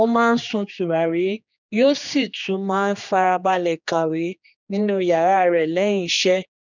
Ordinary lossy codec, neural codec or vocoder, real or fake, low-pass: none; codec, 16 kHz, 2 kbps, X-Codec, HuBERT features, trained on general audio; fake; 7.2 kHz